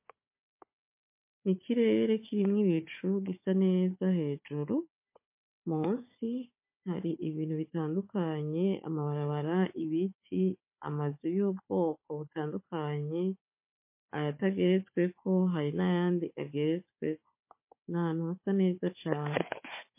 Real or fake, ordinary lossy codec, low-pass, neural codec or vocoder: fake; MP3, 24 kbps; 3.6 kHz; codec, 16 kHz, 4 kbps, FunCodec, trained on Chinese and English, 50 frames a second